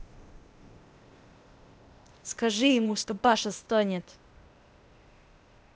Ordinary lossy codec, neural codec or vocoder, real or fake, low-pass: none; codec, 16 kHz, 0.8 kbps, ZipCodec; fake; none